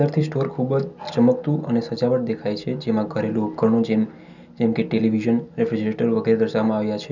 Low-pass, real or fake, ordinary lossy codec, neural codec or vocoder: 7.2 kHz; real; none; none